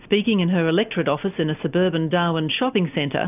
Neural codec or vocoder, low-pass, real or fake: none; 3.6 kHz; real